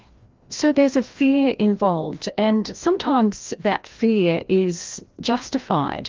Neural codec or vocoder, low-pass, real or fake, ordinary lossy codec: codec, 16 kHz, 1 kbps, FreqCodec, larger model; 7.2 kHz; fake; Opus, 32 kbps